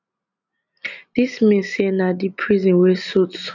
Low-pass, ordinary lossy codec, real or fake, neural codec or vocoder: 7.2 kHz; none; real; none